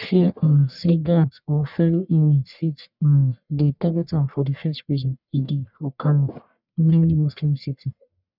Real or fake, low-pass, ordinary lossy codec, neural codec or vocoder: fake; 5.4 kHz; none; codec, 44.1 kHz, 1.7 kbps, Pupu-Codec